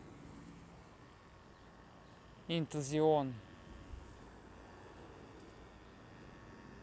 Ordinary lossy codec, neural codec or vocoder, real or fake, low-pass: none; none; real; none